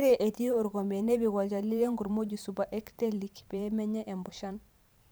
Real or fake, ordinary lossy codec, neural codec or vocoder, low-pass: fake; none; vocoder, 44.1 kHz, 128 mel bands every 512 samples, BigVGAN v2; none